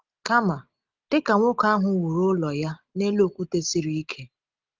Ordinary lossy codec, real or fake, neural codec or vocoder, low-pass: Opus, 32 kbps; real; none; 7.2 kHz